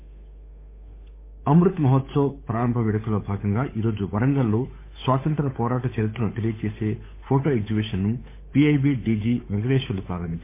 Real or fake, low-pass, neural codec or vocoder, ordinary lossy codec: fake; 3.6 kHz; codec, 16 kHz, 8 kbps, FunCodec, trained on Chinese and English, 25 frames a second; MP3, 24 kbps